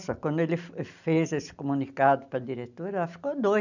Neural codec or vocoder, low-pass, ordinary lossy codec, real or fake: none; 7.2 kHz; none; real